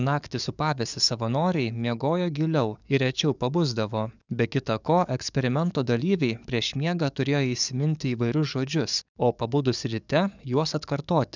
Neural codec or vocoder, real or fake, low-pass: codec, 44.1 kHz, 7.8 kbps, DAC; fake; 7.2 kHz